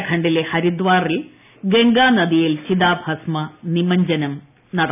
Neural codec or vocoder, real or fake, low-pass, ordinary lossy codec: none; real; 3.6 kHz; none